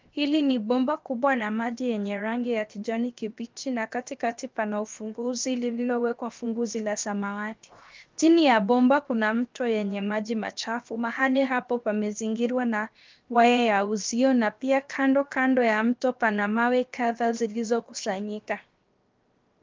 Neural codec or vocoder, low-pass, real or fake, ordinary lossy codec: codec, 16 kHz, 0.7 kbps, FocalCodec; 7.2 kHz; fake; Opus, 32 kbps